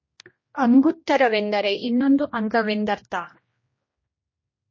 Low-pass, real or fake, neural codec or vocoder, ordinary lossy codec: 7.2 kHz; fake; codec, 16 kHz, 1 kbps, X-Codec, HuBERT features, trained on general audio; MP3, 32 kbps